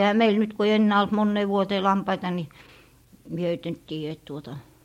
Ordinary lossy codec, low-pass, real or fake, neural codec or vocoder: MP3, 64 kbps; 19.8 kHz; fake; vocoder, 44.1 kHz, 128 mel bands every 512 samples, BigVGAN v2